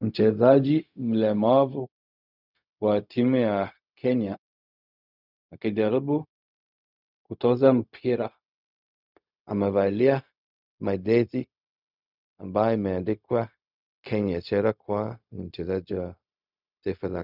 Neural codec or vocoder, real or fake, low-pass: codec, 16 kHz, 0.4 kbps, LongCat-Audio-Codec; fake; 5.4 kHz